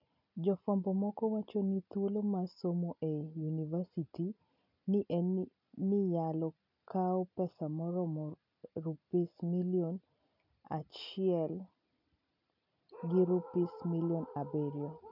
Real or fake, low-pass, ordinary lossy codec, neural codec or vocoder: real; 5.4 kHz; none; none